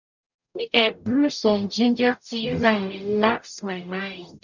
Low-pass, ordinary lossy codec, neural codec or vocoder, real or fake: 7.2 kHz; none; codec, 44.1 kHz, 0.9 kbps, DAC; fake